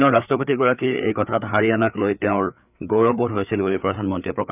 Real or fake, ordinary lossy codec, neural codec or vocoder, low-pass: fake; none; codec, 16 kHz, 4 kbps, FreqCodec, larger model; 3.6 kHz